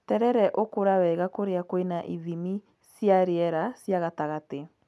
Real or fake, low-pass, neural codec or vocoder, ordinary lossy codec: real; none; none; none